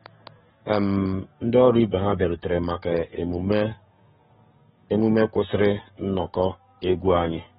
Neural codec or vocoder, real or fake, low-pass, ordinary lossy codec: codec, 44.1 kHz, 7.8 kbps, DAC; fake; 19.8 kHz; AAC, 16 kbps